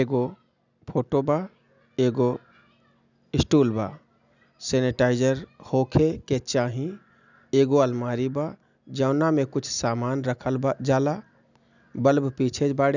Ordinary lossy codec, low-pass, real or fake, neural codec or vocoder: none; 7.2 kHz; real; none